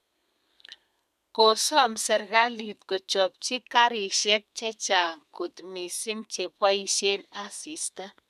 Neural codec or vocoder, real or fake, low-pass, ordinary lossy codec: codec, 32 kHz, 1.9 kbps, SNAC; fake; 14.4 kHz; none